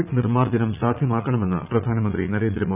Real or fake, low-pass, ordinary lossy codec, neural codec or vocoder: fake; 3.6 kHz; none; vocoder, 44.1 kHz, 80 mel bands, Vocos